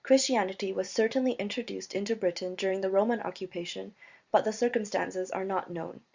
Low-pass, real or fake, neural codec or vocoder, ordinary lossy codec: 7.2 kHz; fake; vocoder, 44.1 kHz, 128 mel bands every 512 samples, BigVGAN v2; Opus, 64 kbps